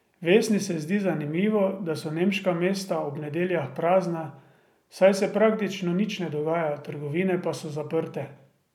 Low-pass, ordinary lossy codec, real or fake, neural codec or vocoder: 19.8 kHz; none; real; none